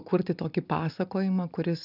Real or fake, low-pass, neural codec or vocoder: real; 5.4 kHz; none